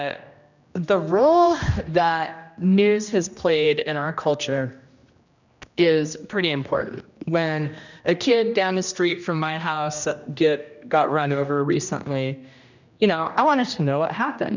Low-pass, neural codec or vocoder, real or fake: 7.2 kHz; codec, 16 kHz, 1 kbps, X-Codec, HuBERT features, trained on general audio; fake